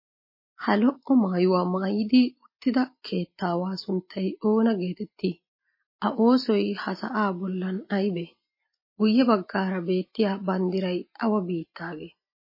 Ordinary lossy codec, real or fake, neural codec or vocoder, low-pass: MP3, 24 kbps; real; none; 5.4 kHz